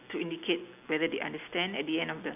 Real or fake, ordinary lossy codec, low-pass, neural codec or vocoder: real; none; 3.6 kHz; none